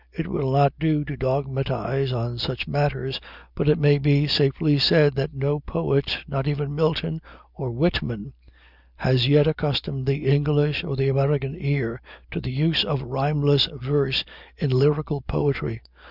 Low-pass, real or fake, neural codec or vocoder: 5.4 kHz; real; none